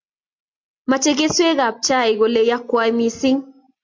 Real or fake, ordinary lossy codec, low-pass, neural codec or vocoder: real; MP3, 48 kbps; 7.2 kHz; none